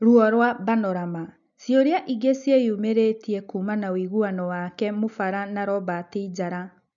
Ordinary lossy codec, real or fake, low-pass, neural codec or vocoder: none; real; 7.2 kHz; none